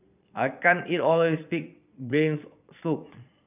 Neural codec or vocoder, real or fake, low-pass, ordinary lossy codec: vocoder, 22.05 kHz, 80 mel bands, Vocos; fake; 3.6 kHz; none